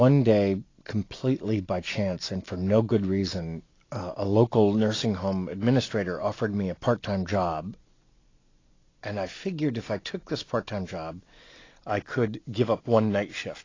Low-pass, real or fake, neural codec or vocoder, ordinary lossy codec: 7.2 kHz; real; none; AAC, 32 kbps